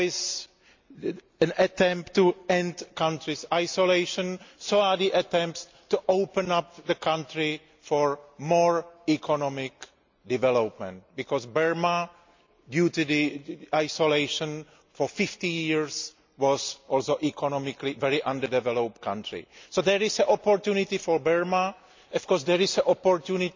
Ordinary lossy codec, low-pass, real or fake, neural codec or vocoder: MP3, 64 kbps; 7.2 kHz; real; none